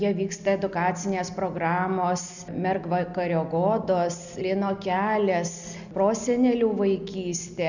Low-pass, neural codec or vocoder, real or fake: 7.2 kHz; none; real